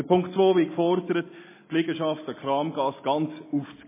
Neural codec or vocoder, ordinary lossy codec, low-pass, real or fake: none; MP3, 16 kbps; 3.6 kHz; real